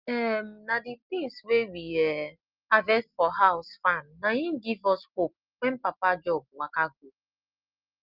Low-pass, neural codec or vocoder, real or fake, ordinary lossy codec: 5.4 kHz; none; real; Opus, 24 kbps